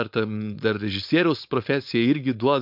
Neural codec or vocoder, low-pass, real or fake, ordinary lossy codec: codec, 16 kHz, 4.8 kbps, FACodec; 5.4 kHz; fake; MP3, 48 kbps